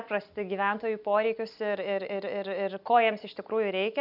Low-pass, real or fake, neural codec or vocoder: 5.4 kHz; real; none